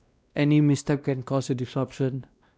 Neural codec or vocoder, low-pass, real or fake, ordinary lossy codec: codec, 16 kHz, 1 kbps, X-Codec, WavLM features, trained on Multilingual LibriSpeech; none; fake; none